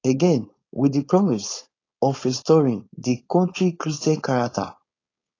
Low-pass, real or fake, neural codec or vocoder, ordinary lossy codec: 7.2 kHz; fake; codec, 16 kHz, 4.8 kbps, FACodec; AAC, 32 kbps